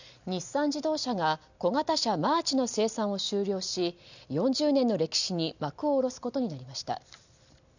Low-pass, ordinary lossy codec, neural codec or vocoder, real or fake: 7.2 kHz; none; none; real